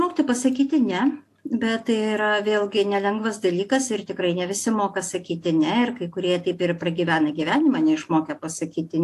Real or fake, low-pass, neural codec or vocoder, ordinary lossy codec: real; 14.4 kHz; none; AAC, 64 kbps